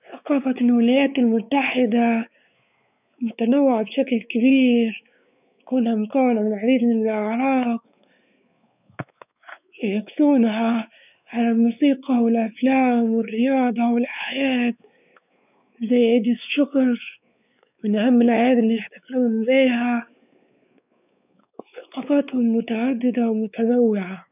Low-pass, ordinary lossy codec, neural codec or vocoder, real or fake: 3.6 kHz; none; codec, 16 kHz, 4 kbps, X-Codec, WavLM features, trained on Multilingual LibriSpeech; fake